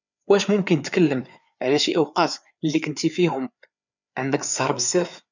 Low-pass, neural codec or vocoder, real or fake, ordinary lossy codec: 7.2 kHz; codec, 16 kHz, 4 kbps, FreqCodec, larger model; fake; none